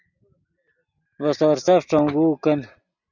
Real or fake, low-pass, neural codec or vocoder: real; 7.2 kHz; none